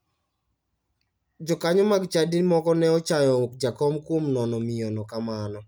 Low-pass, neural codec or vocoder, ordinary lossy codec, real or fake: none; none; none; real